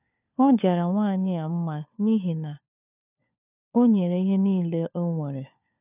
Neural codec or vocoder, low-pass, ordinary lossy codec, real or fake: codec, 16 kHz, 4 kbps, FunCodec, trained on LibriTTS, 50 frames a second; 3.6 kHz; none; fake